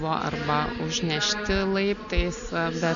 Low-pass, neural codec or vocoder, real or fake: 7.2 kHz; none; real